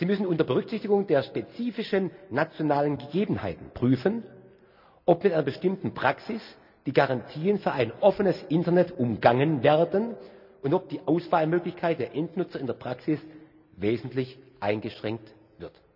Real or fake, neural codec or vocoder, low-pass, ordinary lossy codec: real; none; 5.4 kHz; none